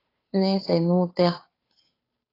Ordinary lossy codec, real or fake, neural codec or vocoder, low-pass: AAC, 24 kbps; fake; codec, 16 kHz, 2 kbps, FunCodec, trained on Chinese and English, 25 frames a second; 5.4 kHz